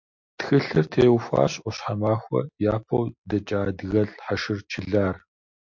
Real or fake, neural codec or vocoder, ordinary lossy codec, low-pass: real; none; MP3, 48 kbps; 7.2 kHz